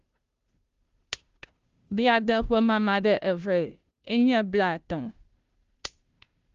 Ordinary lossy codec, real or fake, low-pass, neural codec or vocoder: Opus, 32 kbps; fake; 7.2 kHz; codec, 16 kHz, 0.5 kbps, FunCodec, trained on Chinese and English, 25 frames a second